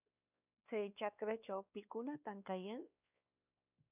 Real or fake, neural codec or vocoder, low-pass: fake; codec, 16 kHz, 2 kbps, X-Codec, WavLM features, trained on Multilingual LibriSpeech; 3.6 kHz